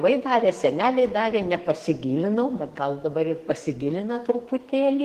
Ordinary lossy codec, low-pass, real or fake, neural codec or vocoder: Opus, 16 kbps; 14.4 kHz; fake; codec, 44.1 kHz, 2.6 kbps, SNAC